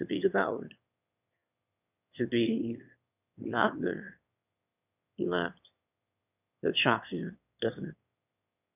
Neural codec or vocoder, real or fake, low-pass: autoencoder, 22.05 kHz, a latent of 192 numbers a frame, VITS, trained on one speaker; fake; 3.6 kHz